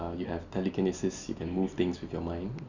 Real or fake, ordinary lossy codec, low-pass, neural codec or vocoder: real; none; 7.2 kHz; none